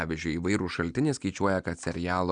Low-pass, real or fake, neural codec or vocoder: 9.9 kHz; real; none